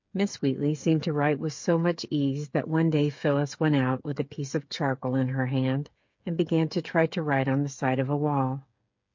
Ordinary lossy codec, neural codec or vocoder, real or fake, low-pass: MP3, 48 kbps; codec, 16 kHz, 8 kbps, FreqCodec, smaller model; fake; 7.2 kHz